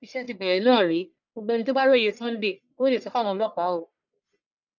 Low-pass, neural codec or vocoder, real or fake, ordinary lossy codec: 7.2 kHz; codec, 44.1 kHz, 1.7 kbps, Pupu-Codec; fake; none